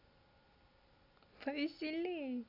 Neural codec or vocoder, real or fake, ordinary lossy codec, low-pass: none; real; none; 5.4 kHz